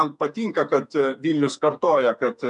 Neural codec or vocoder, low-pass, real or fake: codec, 32 kHz, 1.9 kbps, SNAC; 10.8 kHz; fake